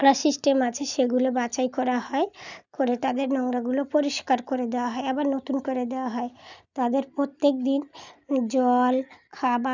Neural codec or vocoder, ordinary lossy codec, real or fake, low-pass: none; none; real; 7.2 kHz